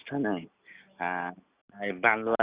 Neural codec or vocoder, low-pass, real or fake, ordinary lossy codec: none; 3.6 kHz; real; Opus, 64 kbps